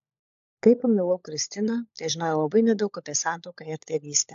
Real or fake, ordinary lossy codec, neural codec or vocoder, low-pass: fake; AAC, 64 kbps; codec, 16 kHz, 16 kbps, FunCodec, trained on LibriTTS, 50 frames a second; 7.2 kHz